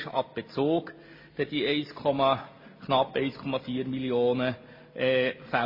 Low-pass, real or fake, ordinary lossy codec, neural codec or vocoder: 5.4 kHz; real; MP3, 24 kbps; none